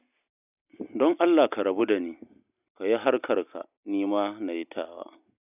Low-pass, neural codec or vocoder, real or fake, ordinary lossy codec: 3.6 kHz; none; real; none